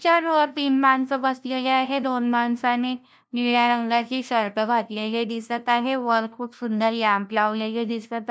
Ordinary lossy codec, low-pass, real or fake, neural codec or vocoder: none; none; fake; codec, 16 kHz, 0.5 kbps, FunCodec, trained on LibriTTS, 25 frames a second